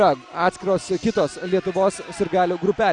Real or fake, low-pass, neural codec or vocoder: real; 9.9 kHz; none